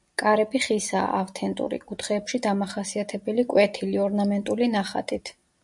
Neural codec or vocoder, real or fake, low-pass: none; real; 10.8 kHz